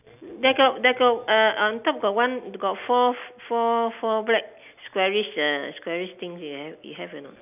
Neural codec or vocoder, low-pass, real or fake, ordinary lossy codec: none; 3.6 kHz; real; none